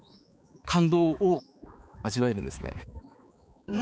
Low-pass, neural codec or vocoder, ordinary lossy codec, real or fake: none; codec, 16 kHz, 4 kbps, X-Codec, HuBERT features, trained on balanced general audio; none; fake